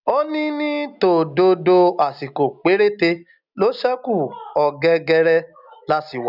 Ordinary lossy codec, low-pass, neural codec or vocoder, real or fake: none; 5.4 kHz; none; real